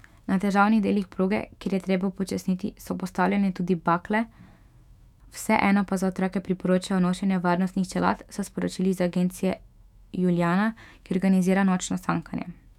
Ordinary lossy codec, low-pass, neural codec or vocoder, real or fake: none; 19.8 kHz; autoencoder, 48 kHz, 128 numbers a frame, DAC-VAE, trained on Japanese speech; fake